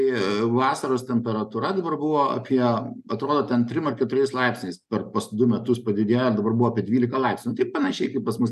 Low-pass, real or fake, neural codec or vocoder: 14.4 kHz; real; none